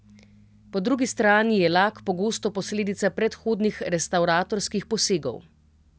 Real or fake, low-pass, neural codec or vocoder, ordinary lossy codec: real; none; none; none